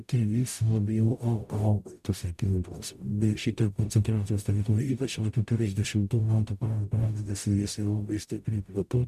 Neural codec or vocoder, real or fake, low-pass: codec, 44.1 kHz, 0.9 kbps, DAC; fake; 14.4 kHz